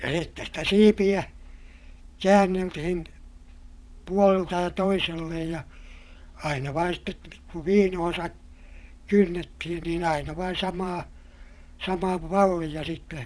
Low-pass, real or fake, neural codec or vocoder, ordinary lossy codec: none; fake; vocoder, 22.05 kHz, 80 mel bands, Vocos; none